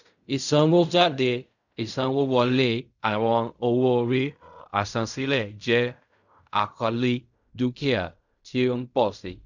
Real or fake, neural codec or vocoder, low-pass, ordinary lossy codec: fake; codec, 16 kHz in and 24 kHz out, 0.4 kbps, LongCat-Audio-Codec, fine tuned four codebook decoder; 7.2 kHz; none